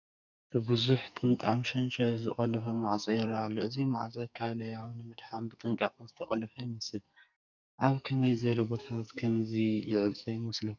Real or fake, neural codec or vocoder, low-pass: fake; codec, 44.1 kHz, 2.6 kbps, SNAC; 7.2 kHz